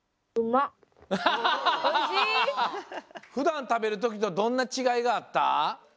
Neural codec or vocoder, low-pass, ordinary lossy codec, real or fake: none; none; none; real